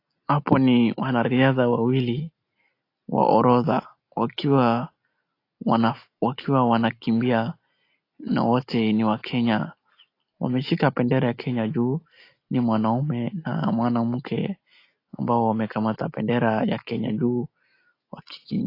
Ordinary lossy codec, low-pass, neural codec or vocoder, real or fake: AAC, 32 kbps; 5.4 kHz; none; real